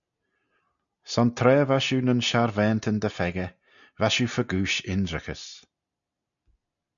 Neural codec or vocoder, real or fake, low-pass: none; real; 7.2 kHz